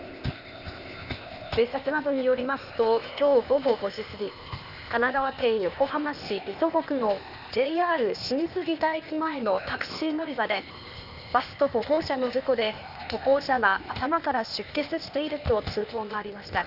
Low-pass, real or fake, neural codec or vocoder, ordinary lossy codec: 5.4 kHz; fake; codec, 16 kHz, 0.8 kbps, ZipCodec; none